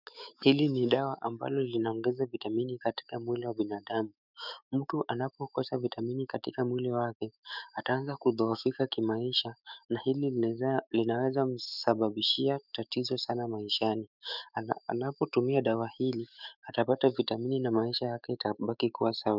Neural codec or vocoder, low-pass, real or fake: autoencoder, 48 kHz, 128 numbers a frame, DAC-VAE, trained on Japanese speech; 5.4 kHz; fake